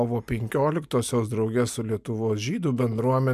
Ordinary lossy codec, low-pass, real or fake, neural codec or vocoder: MP3, 96 kbps; 14.4 kHz; real; none